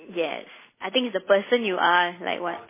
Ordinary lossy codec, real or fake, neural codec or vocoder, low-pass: MP3, 16 kbps; real; none; 3.6 kHz